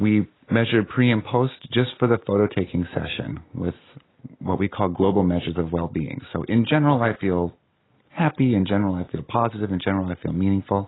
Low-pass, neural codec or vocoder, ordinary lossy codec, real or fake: 7.2 kHz; none; AAC, 16 kbps; real